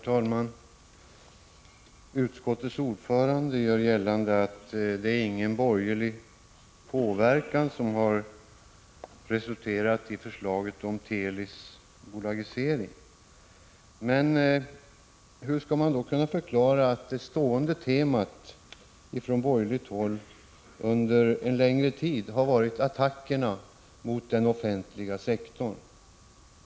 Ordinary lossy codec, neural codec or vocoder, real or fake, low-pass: none; none; real; none